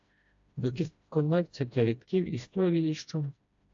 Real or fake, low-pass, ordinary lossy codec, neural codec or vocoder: fake; 7.2 kHz; AAC, 64 kbps; codec, 16 kHz, 1 kbps, FreqCodec, smaller model